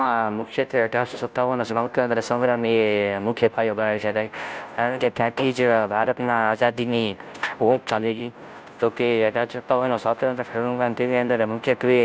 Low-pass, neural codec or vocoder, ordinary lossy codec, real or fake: none; codec, 16 kHz, 0.5 kbps, FunCodec, trained on Chinese and English, 25 frames a second; none; fake